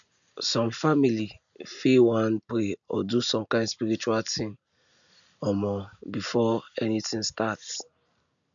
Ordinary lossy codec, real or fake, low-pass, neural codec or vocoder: none; real; 7.2 kHz; none